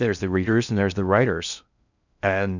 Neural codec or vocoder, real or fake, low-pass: codec, 16 kHz in and 24 kHz out, 0.6 kbps, FocalCodec, streaming, 4096 codes; fake; 7.2 kHz